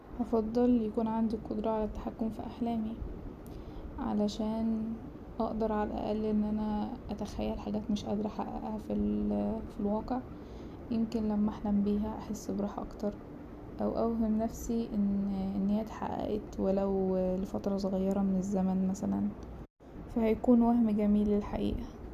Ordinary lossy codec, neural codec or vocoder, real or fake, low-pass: none; none; real; 14.4 kHz